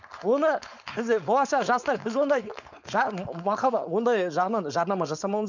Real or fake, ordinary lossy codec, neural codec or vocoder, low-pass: fake; none; codec, 16 kHz, 4.8 kbps, FACodec; 7.2 kHz